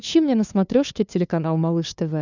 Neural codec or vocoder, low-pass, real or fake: codec, 16 kHz, 2 kbps, FunCodec, trained on LibriTTS, 25 frames a second; 7.2 kHz; fake